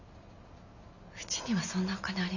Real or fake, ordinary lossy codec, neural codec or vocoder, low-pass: real; none; none; 7.2 kHz